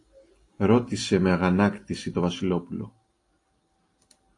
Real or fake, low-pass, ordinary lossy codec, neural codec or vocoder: real; 10.8 kHz; AAC, 48 kbps; none